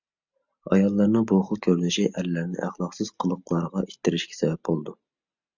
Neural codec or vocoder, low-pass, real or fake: none; 7.2 kHz; real